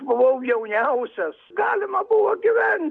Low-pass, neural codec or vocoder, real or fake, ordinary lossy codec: 9.9 kHz; none; real; AAC, 64 kbps